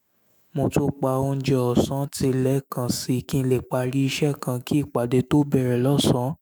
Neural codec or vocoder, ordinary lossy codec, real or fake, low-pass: autoencoder, 48 kHz, 128 numbers a frame, DAC-VAE, trained on Japanese speech; none; fake; none